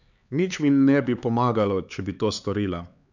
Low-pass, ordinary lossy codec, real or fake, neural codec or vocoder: 7.2 kHz; none; fake; codec, 16 kHz, 4 kbps, X-Codec, HuBERT features, trained on LibriSpeech